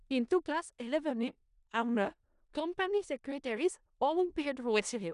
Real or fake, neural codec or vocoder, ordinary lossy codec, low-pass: fake; codec, 16 kHz in and 24 kHz out, 0.4 kbps, LongCat-Audio-Codec, four codebook decoder; none; 10.8 kHz